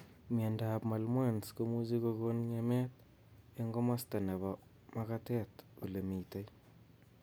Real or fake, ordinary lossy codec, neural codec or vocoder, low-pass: real; none; none; none